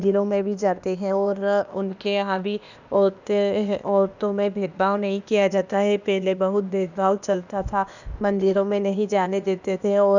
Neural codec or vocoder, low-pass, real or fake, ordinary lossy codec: codec, 16 kHz, 0.8 kbps, ZipCodec; 7.2 kHz; fake; none